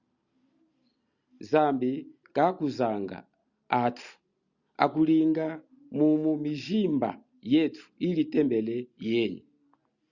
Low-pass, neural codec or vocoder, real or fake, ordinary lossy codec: 7.2 kHz; none; real; Opus, 64 kbps